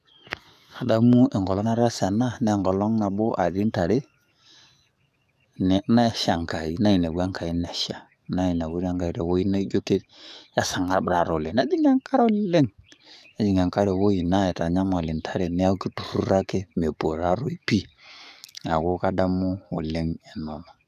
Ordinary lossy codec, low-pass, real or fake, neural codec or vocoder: none; 14.4 kHz; fake; codec, 44.1 kHz, 7.8 kbps, DAC